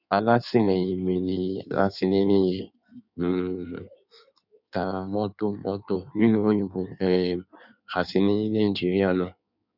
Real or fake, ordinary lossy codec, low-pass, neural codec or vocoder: fake; none; 5.4 kHz; codec, 16 kHz in and 24 kHz out, 1.1 kbps, FireRedTTS-2 codec